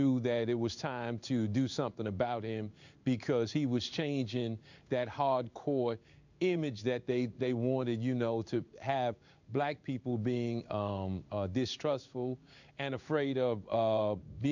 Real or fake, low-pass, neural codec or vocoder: fake; 7.2 kHz; codec, 16 kHz in and 24 kHz out, 1 kbps, XY-Tokenizer